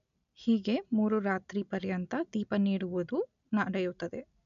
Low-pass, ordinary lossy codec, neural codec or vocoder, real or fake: 7.2 kHz; none; none; real